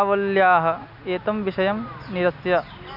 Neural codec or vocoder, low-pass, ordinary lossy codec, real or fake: none; 5.4 kHz; none; real